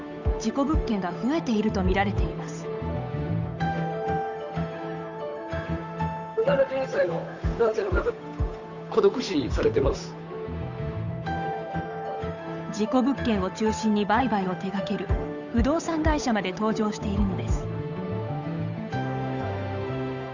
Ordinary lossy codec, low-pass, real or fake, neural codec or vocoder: none; 7.2 kHz; fake; codec, 16 kHz, 8 kbps, FunCodec, trained on Chinese and English, 25 frames a second